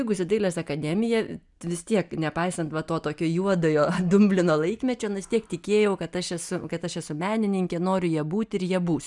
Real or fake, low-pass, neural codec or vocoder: real; 10.8 kHz; none